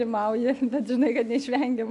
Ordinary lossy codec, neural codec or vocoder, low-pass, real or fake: AAC, 64 kbps; none; 10.8 kHz; real